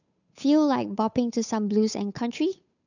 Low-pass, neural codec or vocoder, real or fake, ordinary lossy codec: 7.2 kHz; codec, 16 kHz, 8 kbps, FunCodec, trained on Chinese and English, 25 frames a second; fake; none